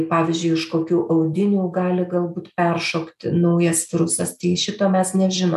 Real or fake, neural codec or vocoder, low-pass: real; none; 14.4 kHz